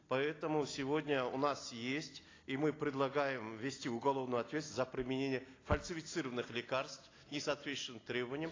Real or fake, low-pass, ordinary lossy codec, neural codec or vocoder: real; 7.2 kHz; AAC, 32 kbps; none